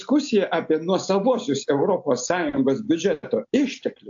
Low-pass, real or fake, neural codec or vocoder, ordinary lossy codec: 7.2 kHz; real; none; AAC, 64 kbps